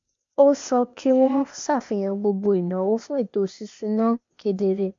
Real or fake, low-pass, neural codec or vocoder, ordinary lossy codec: fake; 7.2 kHz; codec, 16 kHz, 0.8 kbps, ZipCodec; MP3, 48 kbps